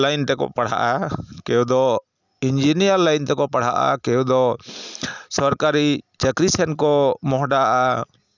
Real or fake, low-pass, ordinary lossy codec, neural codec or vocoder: real; 7.2 kHz; none; none